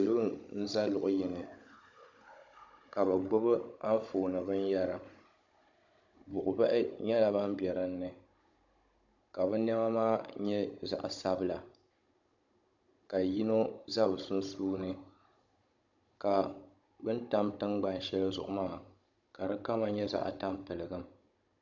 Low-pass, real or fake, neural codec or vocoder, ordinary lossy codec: 7.2 kHz; fake; codec, 16 kHz, 16 kbps, FunCodec, trained on Chinese and English, 50 frames a second; MP3, 64 kbps